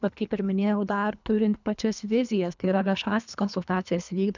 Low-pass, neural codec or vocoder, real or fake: 7.2 kHz; codec, 24 kHz, 3 kbps, HILCodec; fake